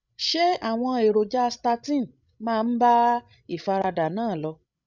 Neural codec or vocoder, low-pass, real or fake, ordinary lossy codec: codec, 16 kHz, 16 kbps, FreqCodec, larger model; 7.2 kHz; fake; none